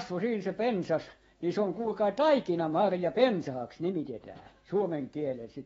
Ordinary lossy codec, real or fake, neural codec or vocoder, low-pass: AAC, 24 kbps; fake; autoencoder, 48 kHz, 128 numbers a frame, DAC-VAE, trained on Japanese speech; 19.8 kHz